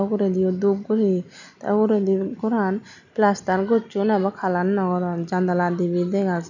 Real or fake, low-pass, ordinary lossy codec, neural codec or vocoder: real; 7.2 kHz; none; none